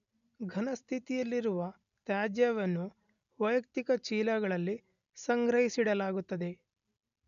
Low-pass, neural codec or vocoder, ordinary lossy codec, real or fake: 7.2 kHz; none; none; real